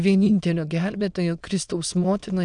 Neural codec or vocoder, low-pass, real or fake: autoencoder, 22.05 kHz, a latent of 192 numbers a frame, VITS, trained on many speakers; 9.9 kHz; fake